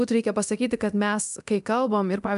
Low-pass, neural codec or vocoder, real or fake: 10.8 kHz; codec, 24 kHz, 0.9 kbps, DualCodec; fake